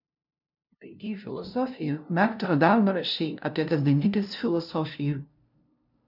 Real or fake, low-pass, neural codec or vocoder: fake; 5.4 kHz; codec, 16 kHz, 0.5 kbps, FunCodec, trained on LibriTTS, 25 frames a second